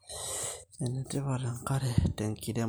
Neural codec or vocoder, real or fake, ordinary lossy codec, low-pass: none; real; none; none